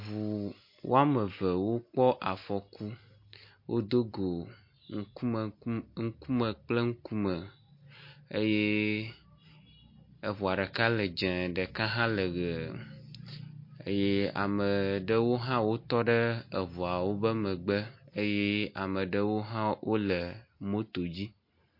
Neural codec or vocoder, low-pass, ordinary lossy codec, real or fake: none; 5.4 kHz; MP3, 32 kbps; real